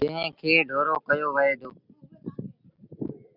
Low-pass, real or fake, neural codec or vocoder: 5.4 kHz; real; none